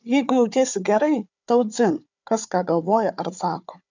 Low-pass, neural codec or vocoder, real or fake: 7.2 kHz; codec, 16 kHz, 4 kbps, FunCodec, trained on Chinese and English, 50 frames a second; fake